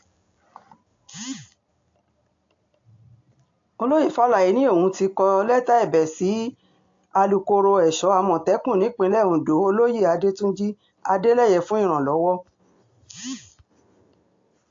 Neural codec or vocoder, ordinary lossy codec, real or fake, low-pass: none; AAC, 48 kbps; real; 7.2 kHz